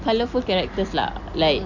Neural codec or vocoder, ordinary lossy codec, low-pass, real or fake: none; none; 7.2 kHz; real